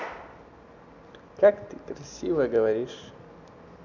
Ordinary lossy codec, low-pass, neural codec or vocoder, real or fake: none; 7.2 kHz; none; real